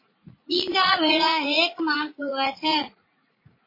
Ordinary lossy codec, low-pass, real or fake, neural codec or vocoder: MP3, 24 kbps; 5.4 kHz; fake; vocoder, 44.1 kHz, 80 mel bands, Vocos